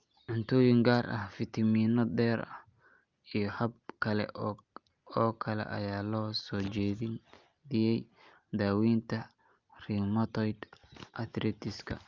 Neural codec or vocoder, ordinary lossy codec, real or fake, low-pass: none; Opus, 24 kbps; real; 7.2 kHz